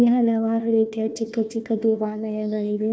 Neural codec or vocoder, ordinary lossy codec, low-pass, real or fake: codec, 16 kHz, 1 kbps, FunCodec, trained on Chinese and English, 50 frames a second; none; none; fake